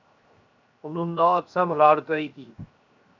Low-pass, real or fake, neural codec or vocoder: 7.2 kHz; fake; codec, 16 kHz, 0.7 kbps, FocalCodec